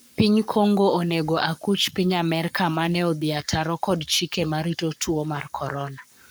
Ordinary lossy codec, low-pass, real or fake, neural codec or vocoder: none; none; fake; codec, 44.1 kHz, 7.8 kbps, Pupu-Codec